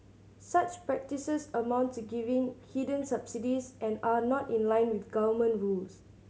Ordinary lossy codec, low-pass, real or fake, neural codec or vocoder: none; none; real; none